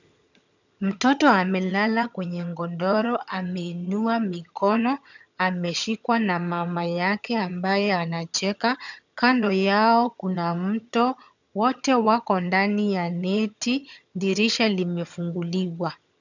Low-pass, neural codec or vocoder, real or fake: 7.2 kHz; vocoder, 22.05 kHz, 80 mel bands, HiFi-GAN; fake